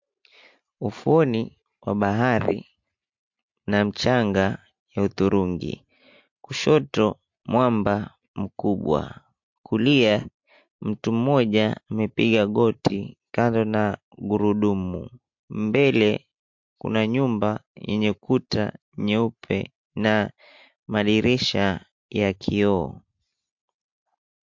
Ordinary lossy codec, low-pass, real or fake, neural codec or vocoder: MP3, 48 kbps; 7.2 kHz; real; none